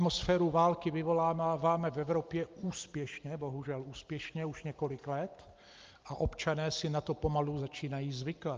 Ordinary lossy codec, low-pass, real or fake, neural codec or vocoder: Opus, 24 kbps; 7.2 kHz; real; none